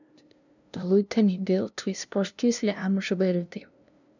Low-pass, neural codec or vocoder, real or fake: 7.2 kHz; codec, 16 kHz, 0.5 kbps, FunCodec, trained on LibriTTS, 25 frames a second; fake